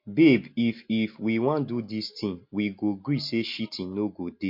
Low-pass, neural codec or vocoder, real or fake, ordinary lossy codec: 5.4 kHz; none; real; MP3, 32 kbps